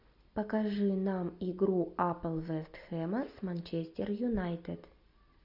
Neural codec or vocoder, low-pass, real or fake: none; 5.4 kHz; real